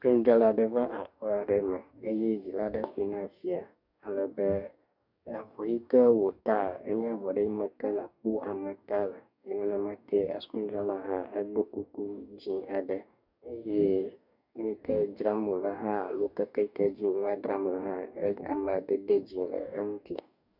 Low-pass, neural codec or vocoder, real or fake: 5.4 kHz; codec, 44.1 kHz, 2.6 kbps, DAC; fake